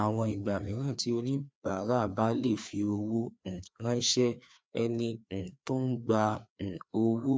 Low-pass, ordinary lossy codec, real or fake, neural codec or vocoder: none; none; fake; codec, 16 kHz, 2 kbps, FreqCodec, larger model